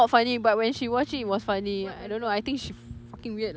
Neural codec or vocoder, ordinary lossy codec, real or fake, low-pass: none; none; real; none